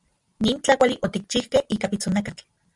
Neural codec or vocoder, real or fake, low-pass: none; real; 10.8 kHz